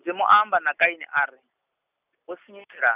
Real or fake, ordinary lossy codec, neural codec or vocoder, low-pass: real; none; none; 3.6 kHz